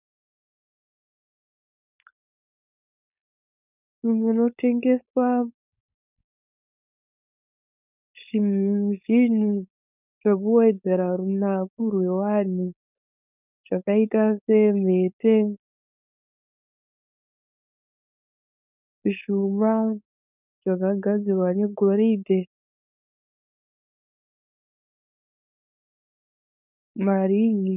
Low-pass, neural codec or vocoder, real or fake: 3.6 kHz; codec, 16 kHz, 4.8 kbps, FACodec; fake